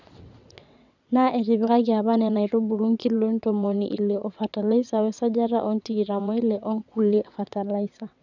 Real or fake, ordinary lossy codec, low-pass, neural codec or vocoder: fake; none; 7.2 kHz; vocoder, 22.05 kHz, 80 mel bands, WaveNeXt